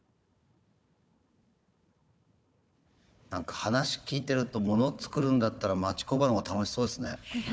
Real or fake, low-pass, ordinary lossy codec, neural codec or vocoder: fake; none; none; codec, 16 kHz, 4 kbps, FunCodec, trained on Chinese and English, 50 frames a second